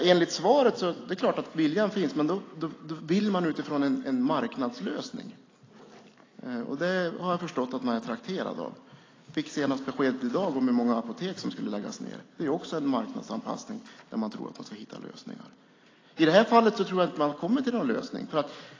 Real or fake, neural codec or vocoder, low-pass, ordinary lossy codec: real; none; 7.2 kHz; AAC, 32 kbps